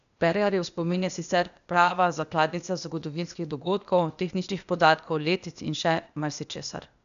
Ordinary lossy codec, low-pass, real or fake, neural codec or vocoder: none; 7.2 kHz; fake; codec, 16 kHz, 0.8 kbps, ZipCodec